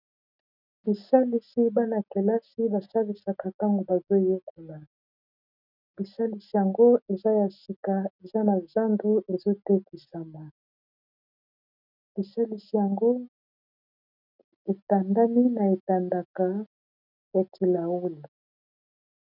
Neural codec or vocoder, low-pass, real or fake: none; 5.4 kHz; real